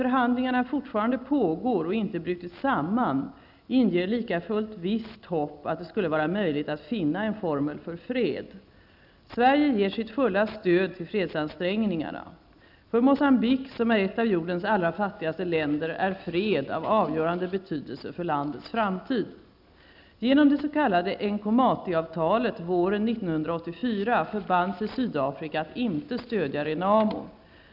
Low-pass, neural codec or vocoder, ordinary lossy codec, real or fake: 5.4 kHz; none; none; real